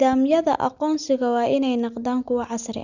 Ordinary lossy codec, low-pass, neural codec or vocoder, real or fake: none; 7.2 kHz; none; real